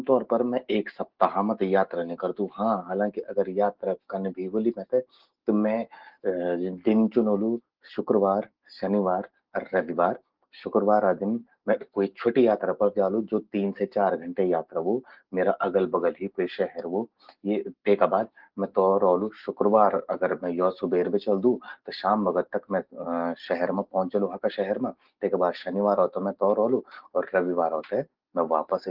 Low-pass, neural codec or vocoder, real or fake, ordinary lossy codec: 5.4 kHz; none; real; Opus, 16 kbps